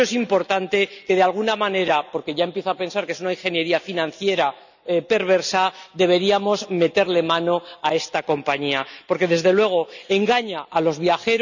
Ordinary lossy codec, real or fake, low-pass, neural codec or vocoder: none; real; 7.2 kHz; none